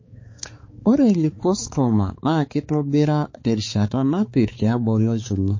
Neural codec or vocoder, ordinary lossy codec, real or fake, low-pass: codec, 16 kHz, 4 kbps, X-Codec, HuBERT features, trained on balanced general audio; MP3, 32 kbps; fake; 7.2 kHz